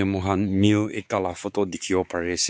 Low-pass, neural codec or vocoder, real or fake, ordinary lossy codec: none; codec, 16 kHz, 4 kbps, X-Codec, WavLM features, trained on Multilingual LibriSpeech; fake; none